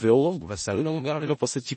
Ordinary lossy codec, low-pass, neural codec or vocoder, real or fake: MP3, 32 kbps; 10.8 kHz; codec, 16 kHz in and 24 kHz out, 0.4 kbps, LongCat-Audio-Codec, four codebook decoder; fake